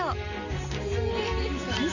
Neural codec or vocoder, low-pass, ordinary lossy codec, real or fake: none; 7.2 kHz; none; real